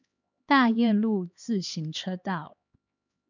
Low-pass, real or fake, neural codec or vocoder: 7.2 kHz; fake; codec, 16 kHz, 2 kbps, X-Codec, HuBERT features, trained on LibriSpeech